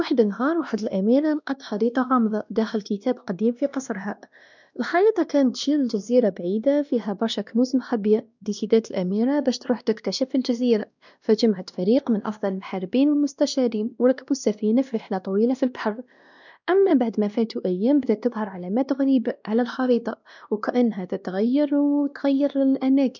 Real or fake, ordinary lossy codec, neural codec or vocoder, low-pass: fake; none; codec, 16 kHz, 1 kbps, X-Codec, WavLM features, trained on Multilingual LibriSpeech; 7.2 kHz